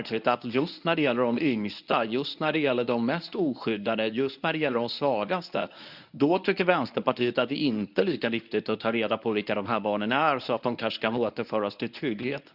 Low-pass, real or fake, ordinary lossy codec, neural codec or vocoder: 5.4 kHz; fake; none; codec, 24 kHz, 0.9 kbps, WavTokenizer, medium speech release version 1